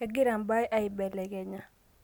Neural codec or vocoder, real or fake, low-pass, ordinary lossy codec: none; real; 19.8 kHz; none